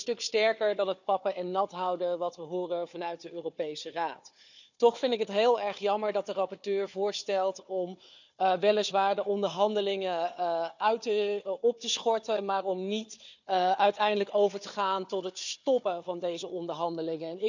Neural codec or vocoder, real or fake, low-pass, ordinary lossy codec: codec, 16 kHz, 4 kbps, FunCodec, trained on Chinese and English, 50 frames a second; fake; 7.2 kHz; none